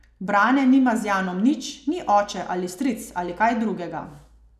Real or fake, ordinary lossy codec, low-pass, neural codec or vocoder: real; none; 14.4 kHz; none